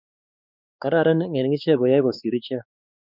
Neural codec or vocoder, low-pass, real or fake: codec, 16 kHz, 4 kbps, X-Codec, WavLM features, trained on Multilingual LibriSpeech; 5.4 kHz; fake